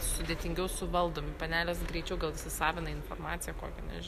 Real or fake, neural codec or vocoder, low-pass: real; none; 14.4 kHz